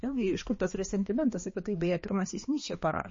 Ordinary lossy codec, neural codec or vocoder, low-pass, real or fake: MP3, 32 kbps; codec, 16 kHz, 2 kbps, X-Codec, HuBERT features, trained on balanced general audio; 7.2 kHz; fake